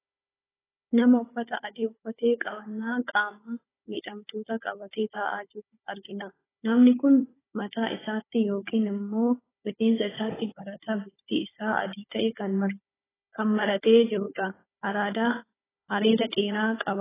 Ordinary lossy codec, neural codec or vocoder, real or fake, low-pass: AAC, 16 kbps; codec, 16 kHz, 16 kbps, FunCodec, trained on Chinese and English, 50 frames a second; fake; 3.6 kHz